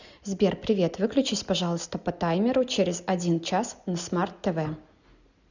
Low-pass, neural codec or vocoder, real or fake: 7.2 kHz; none; real